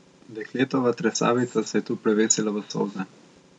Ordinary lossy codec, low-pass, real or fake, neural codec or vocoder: none; 9.9 kHz; real; none